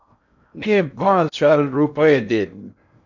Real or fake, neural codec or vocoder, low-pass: fake; codec, 16 kHz in and 24 kHz out, 0.6 kbps, FocalCodec, streaming, 2048 codes; 7.2 kHz